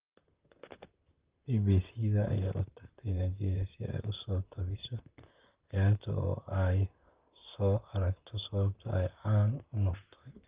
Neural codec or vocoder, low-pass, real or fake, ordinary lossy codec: vocoder, 44.1 kHz, 128 mel bands, Pupu-Vocoder; 3.6 kHz; fake; Opus, 24 kbps